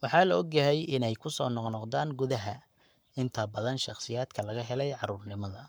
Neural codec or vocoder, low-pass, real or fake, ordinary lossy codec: codec, 44.1 kHz, 7.8 kbps, Pupu-Codec; none; fake; none